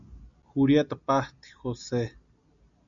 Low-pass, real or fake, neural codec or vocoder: 7.2 kHz; real; none